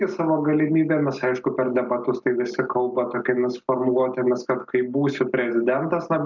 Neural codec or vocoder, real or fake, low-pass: none; real; 7.2 kHz